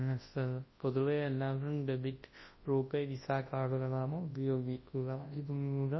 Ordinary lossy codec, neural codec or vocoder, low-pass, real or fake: MP3, 24 kbps; codec, 24 kHz, 0.9 kbps, WavTokenizer, large speech release; 7.2 kHz; fake